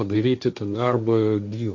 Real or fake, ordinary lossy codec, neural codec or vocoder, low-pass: fake; MP3, 64 kbps; codec, 16 kHz, 1.1 kbps, Voila-Tokenizer; 7.2 kHz